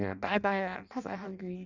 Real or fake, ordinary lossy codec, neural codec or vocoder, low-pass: fake; none; codec, 16 kHz in and 24 kHz out, 0.6 kbps, FireRedTTS-2 codec; 7.2 kHz